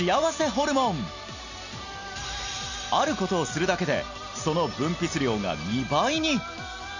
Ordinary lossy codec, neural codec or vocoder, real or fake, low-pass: none; none; real; 7.2 kHz